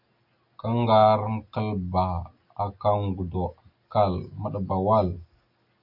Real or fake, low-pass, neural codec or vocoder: real; 5.4 kHz; none